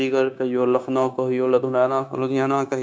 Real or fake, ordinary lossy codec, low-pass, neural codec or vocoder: fake; none; none; codec, 16 kHz, 0.9 kbps, LongCat-Audio-Codec